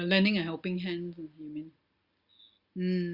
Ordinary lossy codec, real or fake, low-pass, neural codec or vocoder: Opus, 64 kbps; real; 5.4 kHz; none